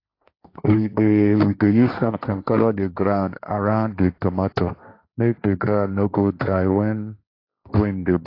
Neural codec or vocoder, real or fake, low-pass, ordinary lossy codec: codec, 16 kHz, 1.1 kbps, Voila-Tokenizer; fake; 5.4 kHz; AAC, 32 kbps